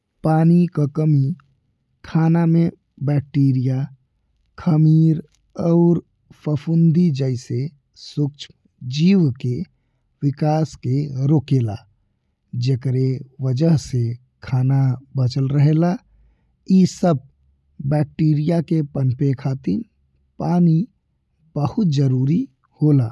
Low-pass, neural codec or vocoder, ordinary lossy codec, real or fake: none; none; none; real